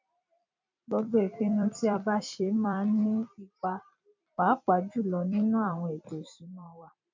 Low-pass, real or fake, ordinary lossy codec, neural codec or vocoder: 7.2 kHz; fake; MP3, 64 kbps; vocoder, 44.1 kHz, 128 mel bands every 256 samples, BigVGAN v2